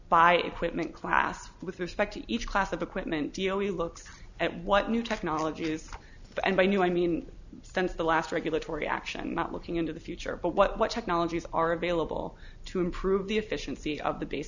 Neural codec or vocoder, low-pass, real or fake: none; 7.2 kHz; real